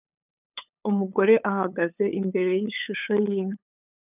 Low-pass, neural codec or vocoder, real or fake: 3.6 kHz; codec, 16 kHz, 8 kbps, FunCodec, trained on LibriTTS, 25 frames a second; fake